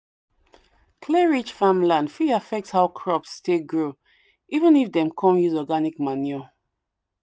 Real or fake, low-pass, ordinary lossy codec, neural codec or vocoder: real; none; none; none